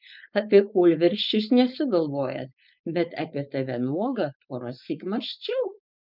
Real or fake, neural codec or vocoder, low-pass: fake; codec, 16 kHz, 4.8 kbps, FACodec; 5.4 kHz